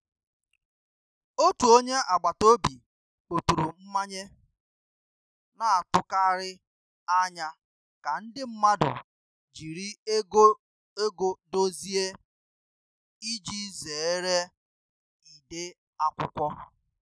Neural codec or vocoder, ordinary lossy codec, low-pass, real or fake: none; none; none; real